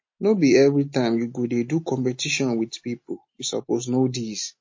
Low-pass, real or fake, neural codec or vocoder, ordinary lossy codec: 7.2 kHz; real; none; MP3, 32 kbps